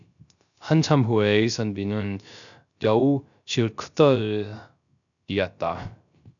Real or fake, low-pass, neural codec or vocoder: fake; 7.2 kHz; codec, 16 kHz, 0.3 kbps, FocalCodec